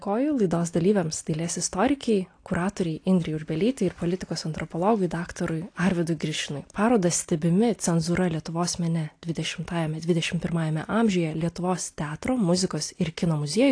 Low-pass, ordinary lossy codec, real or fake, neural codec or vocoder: 9.9 kHz; AAC, 48 kbps; real; none